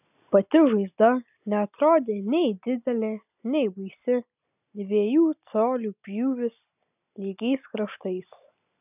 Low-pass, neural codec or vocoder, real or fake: 3.6 kHz; none; real